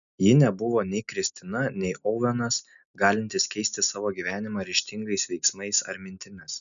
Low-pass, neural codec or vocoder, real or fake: 7.2 kHz; none; real